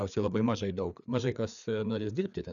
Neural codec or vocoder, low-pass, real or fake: codec, 16 kHz, 4 kbps, FreqCodec, larger model; 7.2 kHz; fake